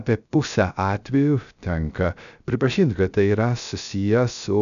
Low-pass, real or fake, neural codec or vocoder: 7.2 kHz; fake; codec, 16 kHz, 0.3 kbps, FocalCodec